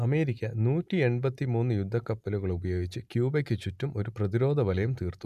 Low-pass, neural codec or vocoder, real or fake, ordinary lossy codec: 14.4 kHz; none; real; none